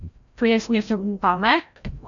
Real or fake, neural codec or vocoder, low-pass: fake; codec, 16 kHz, 0.5 kbps, FreqCodec, larger model; 7.2 kHz